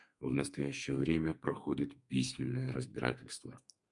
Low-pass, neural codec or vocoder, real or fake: 10.8 kHz; codec, 32 kHz, 1.9 kbps, SNAC; fake